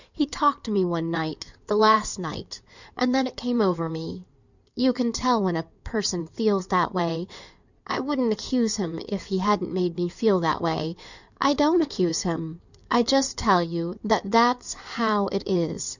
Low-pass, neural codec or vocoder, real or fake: 7.2 kHz; codec, 16 kHz in and 24 kHz out, 2.2 kbps, FireRedTTS-2 codec; fake